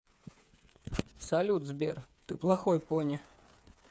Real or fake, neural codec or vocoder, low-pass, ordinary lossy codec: fake; codec, 16 kHz, 8 kbps, FreqCodec, smaller model; none; none